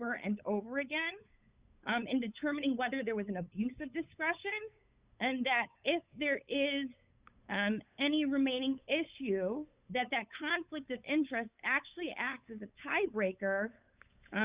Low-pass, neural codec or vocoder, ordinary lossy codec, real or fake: 3.6 kHz; codec, 16 kHz, 8 kbps, FunCodec, trained on LibriTTS, 25 frames a second; Opus, 64 kbps; fake